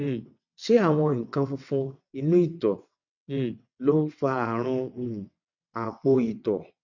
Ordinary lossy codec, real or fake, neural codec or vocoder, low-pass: none; fake; vocoder, 22.05 kHz, 80 mel bands, WaveNeXt; 7.2 kHz